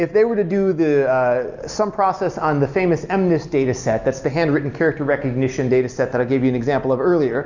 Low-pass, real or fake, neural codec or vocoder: 7.2 kHz; real; none